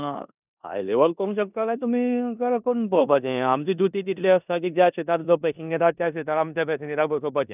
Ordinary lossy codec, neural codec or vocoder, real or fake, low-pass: none; codec, 16 kHz in and 24 kHz out, 0.9 kbps, LongCat-Audio-Codec, four codebook decoder; fake; 3.6 kHz